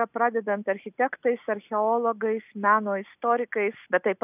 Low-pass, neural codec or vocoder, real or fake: 3.6 kHz; none; real